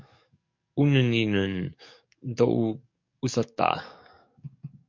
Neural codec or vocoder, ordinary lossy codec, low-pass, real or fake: codec, 44.1 kHz, 7.8 kbps, DAC; MP3, 48 kbps; 7.2 kHz; fake